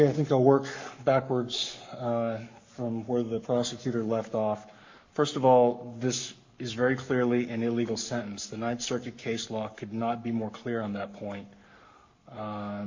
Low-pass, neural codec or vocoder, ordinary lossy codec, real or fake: 7.2 kHz; codec, 44.1 kHz, 7.8 kbps, Pupu-Codec; MP3, 48 kbps; fake